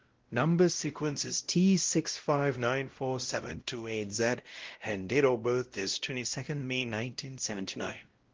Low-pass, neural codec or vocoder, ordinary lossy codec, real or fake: 7.2 kHz; codec, 16 kHz, 0.5 kbps, X-Codec, WavLM features, trained on Multilingual LibriSpeech; Opus, 16 kbps; fake